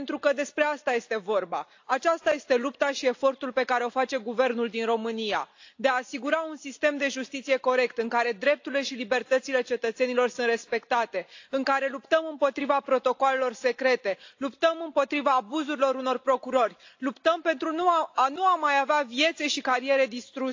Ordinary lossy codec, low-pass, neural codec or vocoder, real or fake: AAC, 48 kbps; 7.2 kHz; none; real